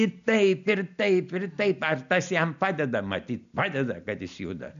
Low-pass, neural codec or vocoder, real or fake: 7.2 kHz; none; real